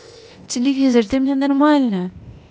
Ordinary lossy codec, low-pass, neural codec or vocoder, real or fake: none; none; codec, 16 kHz, 0.8 kbps, ZipCodec; fake